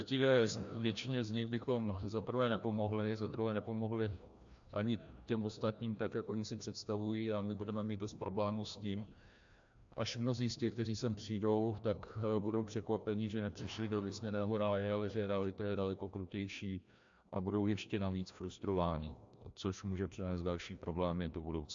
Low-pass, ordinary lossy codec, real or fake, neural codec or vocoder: 7.2 kHz; AAC, 64 kbps; fake; codec, 16 kHz, 1 kbps, FreqCodec, larger model